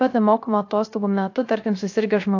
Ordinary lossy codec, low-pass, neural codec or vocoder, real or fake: AAC, 48 kbps; 7.2 kHz; codec, 16 kHz, 0.3 kbps, FocalCodec; fake